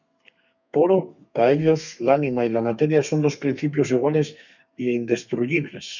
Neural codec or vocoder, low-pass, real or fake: codec, 32 kHz, 1.9 kbps, SNAC; 7.2 kHz; fake